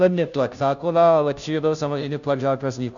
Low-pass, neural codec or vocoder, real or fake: 7.2 kHz; codec, 16 kHz, 0.5 kbps, FunCodec, trained on Chinese and English, 25 frames a second; fake